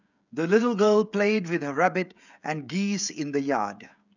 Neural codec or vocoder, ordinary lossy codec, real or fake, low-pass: codec, 16 kHz, 16 kbps, FreqCodec, smaller model; none; fake; 7.2 kHz